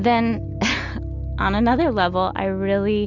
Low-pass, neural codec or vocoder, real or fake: 7.2 kHz; none; real